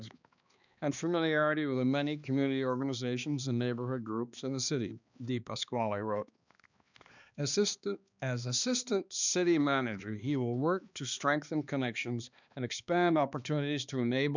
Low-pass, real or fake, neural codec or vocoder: 7.2 kHz; fake; codec, 16 kHz, 2 kbps, X-Codec, HuBERT features, trained on balanced general audio